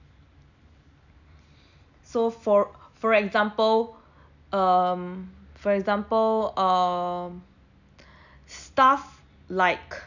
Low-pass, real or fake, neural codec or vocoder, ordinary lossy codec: 7.2 kHz; real; none; none